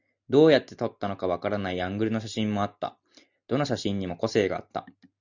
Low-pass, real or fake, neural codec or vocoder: 7.2 kHz; real; none